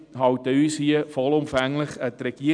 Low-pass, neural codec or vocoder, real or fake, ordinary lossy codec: 9.9 kHz; none; real; none